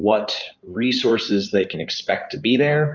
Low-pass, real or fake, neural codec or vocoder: 7.2 kHz; fake; codec, 16 kHz in and 24 kHz out, 2.2 kbps, FireRedTTS-2 codec